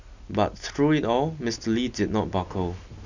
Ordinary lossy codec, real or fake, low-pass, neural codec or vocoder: none; real; 7.2 kHz; none